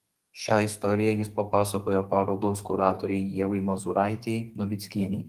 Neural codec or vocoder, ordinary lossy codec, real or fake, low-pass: codec, 32 kHz, 1.9 kbps, SNAC; Opus, 24 kbps; fake; 14.4 kHz